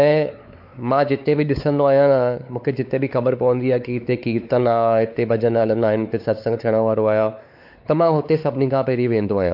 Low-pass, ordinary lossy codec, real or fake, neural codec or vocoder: 5.4 kHz; AAC, 48 kbps; fake; codec, 16 kHz, 4 kbps, X-Codec, WavLM features, trained on Multilingual LibriSpeech